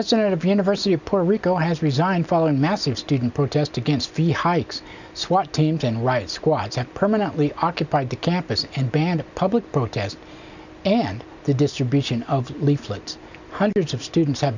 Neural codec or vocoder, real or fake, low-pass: none; real; 7.2 kHz